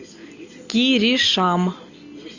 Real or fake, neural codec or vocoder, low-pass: real; none; 7.2 kHz